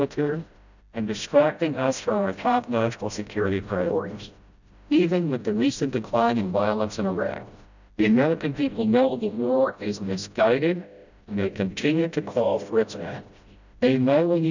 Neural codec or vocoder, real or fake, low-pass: codec, 16 kHz, 0.5 kbps, FreqCodec, smaller model; fake; 7.2 kHz